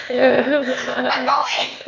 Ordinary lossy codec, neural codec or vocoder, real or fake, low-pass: none; codec, 16 kHz, 0.8 kbps, ZipCodec; fake; 7.2 kHz